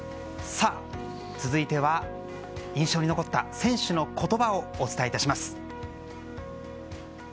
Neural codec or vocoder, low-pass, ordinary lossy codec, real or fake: none; none; none; real